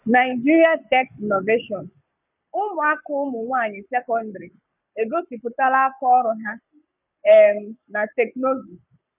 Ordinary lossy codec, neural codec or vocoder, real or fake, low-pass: none; codec, 44.1 kHz, 7.8 kbps, Pupu-Codec; fake; 3.6 kHz